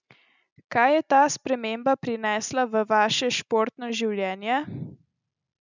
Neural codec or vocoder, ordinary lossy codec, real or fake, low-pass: none; none; real; 7.2 kHz